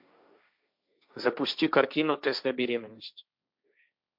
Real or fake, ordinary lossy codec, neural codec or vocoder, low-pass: fake; MP3, 48 kbps; codec, 16 kHz, 1.1 kbps, Voila-Tokenizer; 5.4 kHz